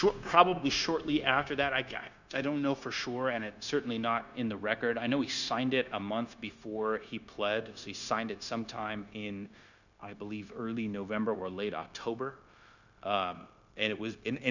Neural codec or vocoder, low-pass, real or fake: codec, 16 kHz, 0.9 kbps, LongCat-Audio-Codec; 7.2 kHz; fake